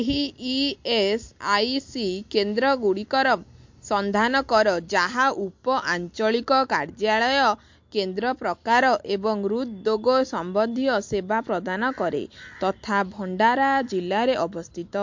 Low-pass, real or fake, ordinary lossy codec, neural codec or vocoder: 7.2 kHz; real; MP3, 48 kbps; none